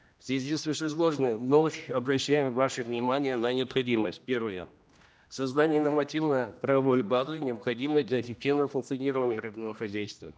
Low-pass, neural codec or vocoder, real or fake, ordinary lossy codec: none; codec, 16 kHz, 1 kbps, X-Codec, HuBERT features, trained on general audio; fake; none